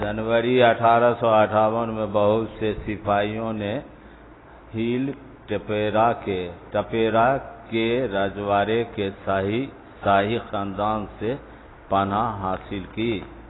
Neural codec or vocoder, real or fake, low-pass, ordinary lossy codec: none; real; 7.2 kHz; AAC, 16 kbps